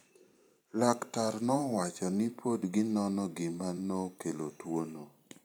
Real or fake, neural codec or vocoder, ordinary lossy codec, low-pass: fake; vocoder, 44.1 kHz, 128 mel bands every 512 samples, BigVGAN v2; none; none